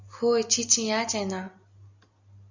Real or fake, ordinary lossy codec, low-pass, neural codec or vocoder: real; Opus, 64 kbps; 7.2 kHz; none